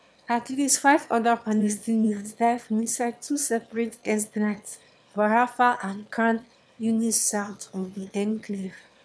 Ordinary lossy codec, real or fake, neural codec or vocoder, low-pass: none; fake; autoencoder, 22.05 kHz, a latent of 192 numbers a frame, VITS, trained on one speaker; none